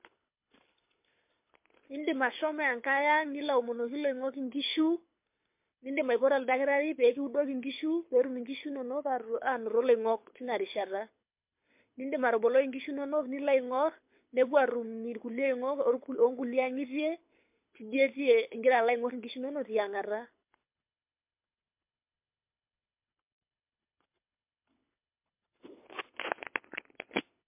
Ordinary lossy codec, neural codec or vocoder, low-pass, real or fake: MP3, 32 kbps; codec, 24 kHz, 6 kbps, HILCodec; 3.6 kHz; fake